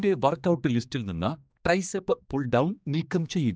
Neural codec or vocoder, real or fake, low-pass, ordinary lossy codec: codec, 16 kHz, 2 kbps, X-Codec, HuBERT features, trained on general audio; fake; none; none